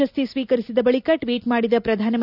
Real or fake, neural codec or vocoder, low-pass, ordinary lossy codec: real; none; 5.4 kHz; none